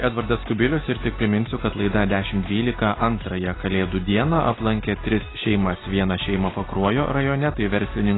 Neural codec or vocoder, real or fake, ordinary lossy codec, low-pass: none; real; AAC, 16 kbps; 7.2 kHz